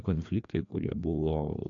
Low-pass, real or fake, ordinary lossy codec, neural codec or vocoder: 7.2 kHz; fake; AAC, 64 kbps; codec, 16 kHz, 2 kbps, FreqCodec, larger model